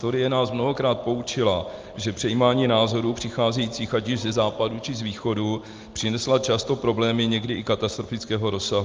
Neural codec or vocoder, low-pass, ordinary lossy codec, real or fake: none; 7.2 kHz; Opus, 32 kbps; real